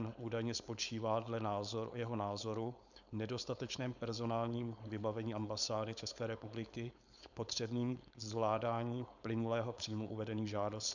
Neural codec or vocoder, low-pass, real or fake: codec, 16 kHz, 4.8 kbps, FACodec; 7.2 kHz; fake